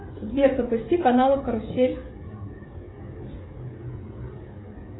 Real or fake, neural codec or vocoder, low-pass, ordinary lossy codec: fake; codec, 16 kHz, 16 kbps, FreqCodec, smaller model; 7.2 kHz; AAC, 16 kbps